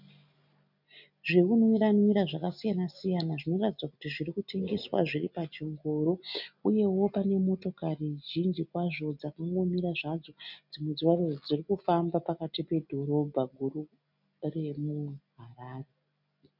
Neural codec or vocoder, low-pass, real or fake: none; 5.4 kHz; real